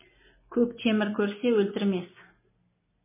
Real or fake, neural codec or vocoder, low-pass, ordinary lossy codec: real; none; 3.6 kHz; MP3, 16 kbps